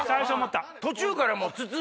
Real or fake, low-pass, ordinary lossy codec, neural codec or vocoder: real; none; none; none